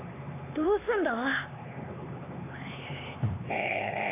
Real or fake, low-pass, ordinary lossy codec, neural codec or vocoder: fake; 3.6 kHz; AAC, 16 kbps; codec, 16 kHz, 4 kbps, X-Codec, HuBERT features, trained on LibriSpeech